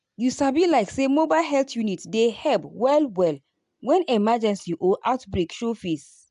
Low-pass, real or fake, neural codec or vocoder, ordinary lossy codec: 10.8 kHz; real; none; AAC, 96 kbps